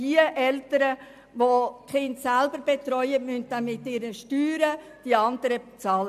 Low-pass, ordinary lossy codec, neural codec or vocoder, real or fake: 14.4 kHz; MP3, 96 kbps; none; real